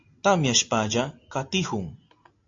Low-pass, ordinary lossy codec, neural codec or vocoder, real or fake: 7.2 kHz; AAC, 48 kbps; none; real